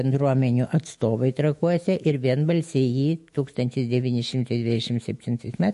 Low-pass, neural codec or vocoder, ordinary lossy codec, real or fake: 14.4 kHz; autoencoder, 48 kHz, 128 numbers a frame, DAC-VAE, trained on Japanese speech; MP3, 48 kbps; fake